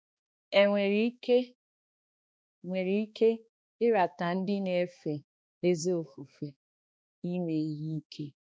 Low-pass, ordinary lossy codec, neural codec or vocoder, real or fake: none; none; codec, 16 kHz, 2 kbps, X-Codec, HuBERT features, trained on balanced general audio; fake